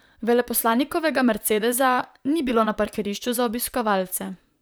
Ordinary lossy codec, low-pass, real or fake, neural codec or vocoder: none; none; fake; vocoder, 44.1 kHz, 128 mel bands, Pupu-Vocoder